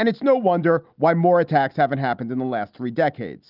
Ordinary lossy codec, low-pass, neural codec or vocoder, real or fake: Opus, 32 kbps; 5.4 kHz; none; real